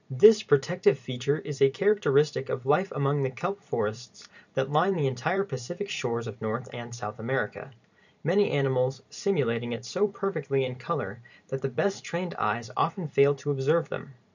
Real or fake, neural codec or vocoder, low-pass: fake; vocoder, 44.1 kHz, 128 mel bands every 512 samples, BigVGAN v2; 7.2 kHz